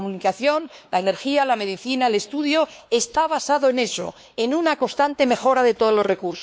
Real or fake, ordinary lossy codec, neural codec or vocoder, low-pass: fake; none; codec, 16 kHz, 2 kbps, X-Codec, WavLM features, trained on Multilingual LibriSpeech; none